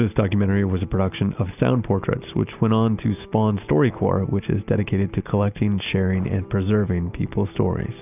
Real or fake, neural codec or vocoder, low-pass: real; none; 3.6 kHz